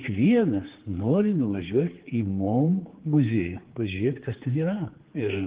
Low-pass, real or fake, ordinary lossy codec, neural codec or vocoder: 3.6 kHz; fake; Opus, 16 kbps; codec, 16 kHz, 4 kbps, X-Codec, HuBERT features, trained on general audio